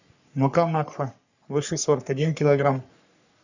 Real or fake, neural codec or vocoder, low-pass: fake; codec, 44.1 kHz, 3.4 kbps, Pupu-Codec; 7.2 kHz